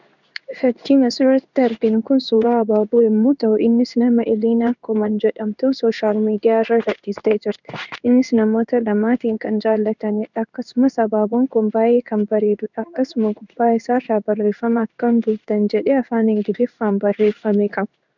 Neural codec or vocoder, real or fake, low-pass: codec, 16 kHz in and 24 kHz out, 1 kbps, XY-Tokenizer; fake; 7.2 kHz